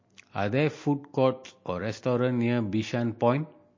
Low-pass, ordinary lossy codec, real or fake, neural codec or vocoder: 7.2 kHz; MP3, 32 kbps; real; none